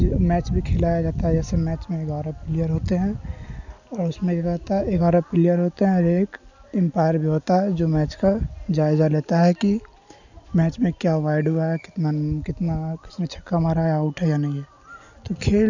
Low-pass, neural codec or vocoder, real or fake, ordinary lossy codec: 7.2 kHz; none; real; none